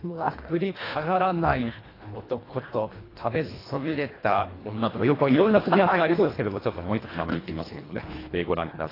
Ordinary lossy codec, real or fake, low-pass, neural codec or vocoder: AAC, 24 kbps; fake; 5.4 kHz; codec, 24 kHz, 1.5 kbps, HILCodec